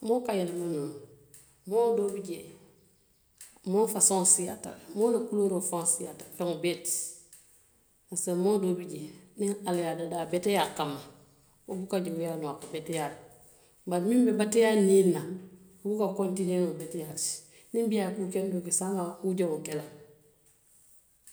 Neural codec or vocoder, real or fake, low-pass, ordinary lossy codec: none; real; none; none